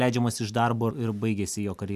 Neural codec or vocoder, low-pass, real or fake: none; 14.4 kHz; real